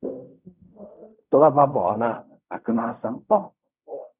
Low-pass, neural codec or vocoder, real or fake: 3.6 kHz; codec, 16 kHz in and 24 kHz out, 0.4 kbps, LongCat-Audio-Codec, fine tuned four codebook decoder; fake